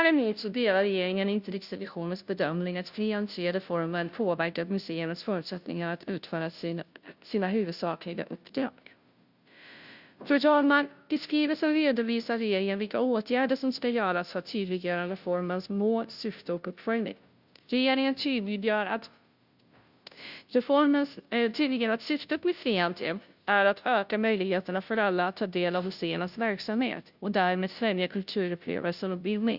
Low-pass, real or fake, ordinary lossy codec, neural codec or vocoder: 5.4 kHz; fake; Opus, 64 kbps; codec, 16 kHz, 0.5 kbps, FunCodec, trained on Chinese and English, 25 frames a second